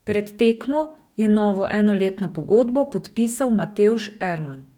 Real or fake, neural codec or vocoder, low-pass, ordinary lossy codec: fake; codec, 44.1 kHz, 2.6 kbps, DAC; 19.8 kHz; none